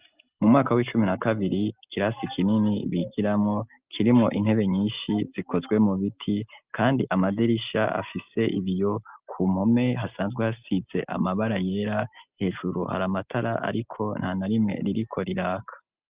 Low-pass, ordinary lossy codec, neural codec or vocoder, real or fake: 3.6 kHz; Opus, 24 kbps; none; real